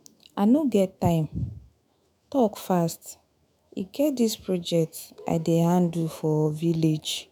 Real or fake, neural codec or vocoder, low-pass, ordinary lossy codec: fake; autoencoder, 48 kHz, 128 numbers a frame, DAC-VAE, trained on Japanese speech; none; none